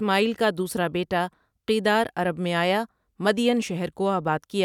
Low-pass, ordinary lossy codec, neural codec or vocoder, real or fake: 19.8 kHz; none; none; real